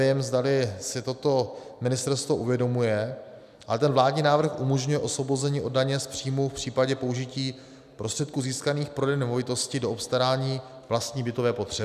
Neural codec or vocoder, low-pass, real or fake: none; 14.4 kHz; real